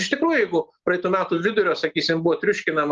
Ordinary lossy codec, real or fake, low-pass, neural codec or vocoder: Opus, 32 kbps; real; 7.2 kHz; none